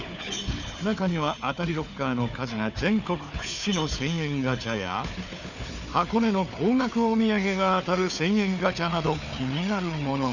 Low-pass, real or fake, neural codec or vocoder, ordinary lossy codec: 7.2 kHz; fake; codec, 16 kHz, 4 kbps, FunCodec, trained on Chinese and English, 50 frames a second; none